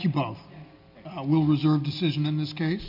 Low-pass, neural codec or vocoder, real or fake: 5.4 kHz; none; real